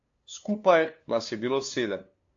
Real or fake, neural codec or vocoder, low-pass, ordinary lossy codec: fake; codec, 16 kHz, 2 kbps, FunCodec, trained on LibriTTS, 25 frames a second; 7.2 kHz; AAC, 48 kbps